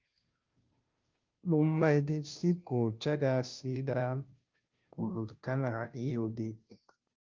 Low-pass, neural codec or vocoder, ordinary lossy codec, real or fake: 7.2 kHz; codec, 16 kHz, 0.5 kbps, FunCodec, trained on Chinese and English, 25 frames a second; Opus, 24 kbps; fake